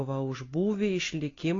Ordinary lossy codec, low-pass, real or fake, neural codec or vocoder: AAC, 32 kbps; 7.2 kHz; real; none